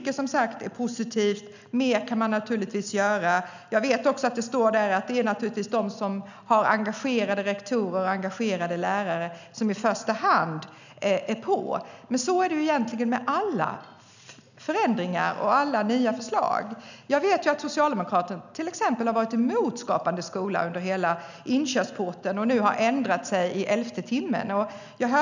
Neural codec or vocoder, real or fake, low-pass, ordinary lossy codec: none; real; 7.2 kHz; none